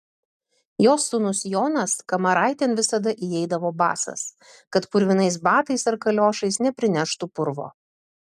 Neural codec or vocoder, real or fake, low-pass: none; real; 14.4 kHz